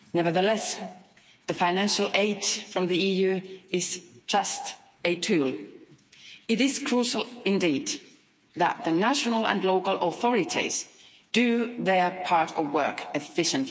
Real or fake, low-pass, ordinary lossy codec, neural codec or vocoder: fake; none; none; codec, 16 kHz, 4 kbps, FreqCodec, smaller model